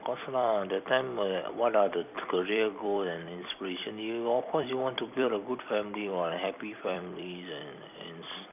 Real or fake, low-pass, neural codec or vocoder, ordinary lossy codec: fake; 3.6 kHz; vocoder, 44.1 kHz, 128 mel bands every 256 samples, BigVGAN v2; none